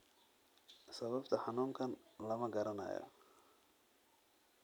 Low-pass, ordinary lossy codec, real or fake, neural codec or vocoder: none; none; real; none